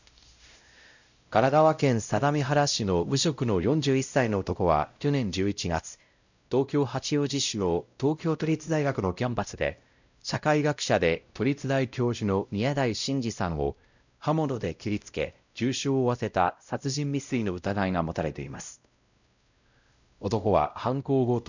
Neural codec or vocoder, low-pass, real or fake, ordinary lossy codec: codec, 16 kHz, 0.5 kbps, X-Codec, WavLM features, trained on Multilingual LibriSpeech; 7.2 kHz; fake; none